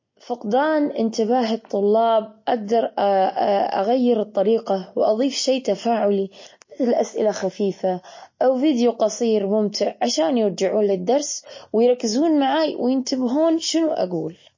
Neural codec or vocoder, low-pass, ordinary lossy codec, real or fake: none; 7.2 kHz; MP3, 32 kbps; real